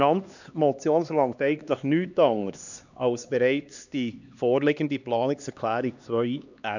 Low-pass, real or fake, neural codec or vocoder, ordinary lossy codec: 7.2 kHz; fake; codec, 16 kHz, 2 kbps, X-Codec, HuBERT features, trained on LibriSpeech; none